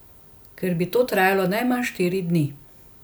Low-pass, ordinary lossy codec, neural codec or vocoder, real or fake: none; none; none; real